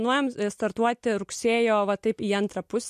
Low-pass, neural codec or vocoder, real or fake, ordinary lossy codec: 14.4 kHz; none; real; MP3, 64 kbps